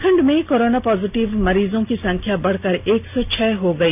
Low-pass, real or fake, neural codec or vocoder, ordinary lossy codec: 3.6 kHz; real; none; none